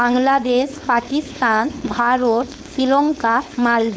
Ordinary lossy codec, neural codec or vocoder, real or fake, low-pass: none; codec, 16 kHz, 4.8 kbps, FACodec; fake; none